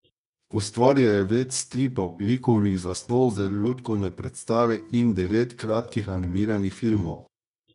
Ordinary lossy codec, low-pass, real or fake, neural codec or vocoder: none; 10.8 kHz; fake; codec, 24 kHz, 0.9 kbps, WavTokenizer, medium music audio release